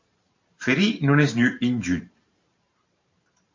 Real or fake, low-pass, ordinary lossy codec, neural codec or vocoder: fake; 7.2 kHz; MP3, 48 kbps; vocoder, 44.1 kHz, 128 mel bands every 512 samples, BigVGAN v2